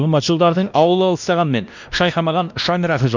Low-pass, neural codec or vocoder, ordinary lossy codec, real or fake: 7.2 kHz; codec, 16 kHz, 1 kbps, X-Codec, WavLM features, trained on Multilingual LibriSpeech; none; fake